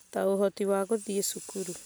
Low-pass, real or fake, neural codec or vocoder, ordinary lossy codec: none; real; none; none